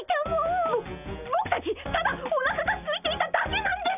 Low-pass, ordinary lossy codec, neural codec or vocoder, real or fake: 3.6 kHz; none; none; real